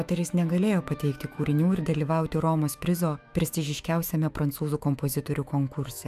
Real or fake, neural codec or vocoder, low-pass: fake; autoencoder, 48 kHz, 128 numbers a frame, DAC-VAE, trained on Japanese speech; 14.4 kHz